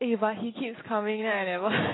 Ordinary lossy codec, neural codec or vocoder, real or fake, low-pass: AAC, 16 kbps; none; real; 7.2 kHz